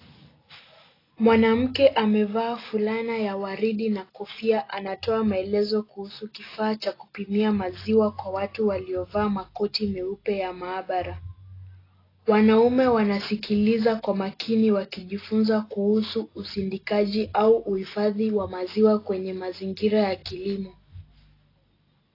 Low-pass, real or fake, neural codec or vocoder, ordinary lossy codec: 5.4 kHz; real; none; AAC, 24 kbps